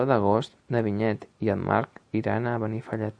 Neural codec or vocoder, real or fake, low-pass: none; real; 9.9 kHz